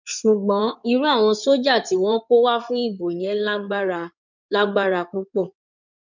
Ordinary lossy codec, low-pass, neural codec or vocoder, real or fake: none; 7.2 kHz; codec, 16 kHz in and 24 kHz out, 2.2 kbps, FireRedTTS-2 codec; fake